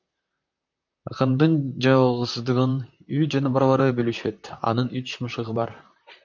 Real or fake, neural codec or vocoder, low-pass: fake; vocoder, 44.1 kHz, 128 mel bands, Pupu-Vocoder; 7.2 kHz